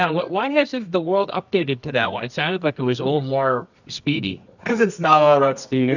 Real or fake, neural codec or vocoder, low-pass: fake; codec, 24 kHz, 0.9 kbps, WavTokenizer, medium music audio release; 7.2 kHz